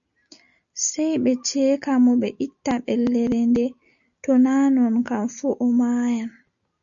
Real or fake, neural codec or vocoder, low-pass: real; none; 7.2 kHz